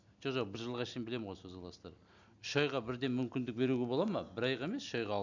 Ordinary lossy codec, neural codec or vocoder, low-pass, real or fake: none; none; 7.2 kHz; real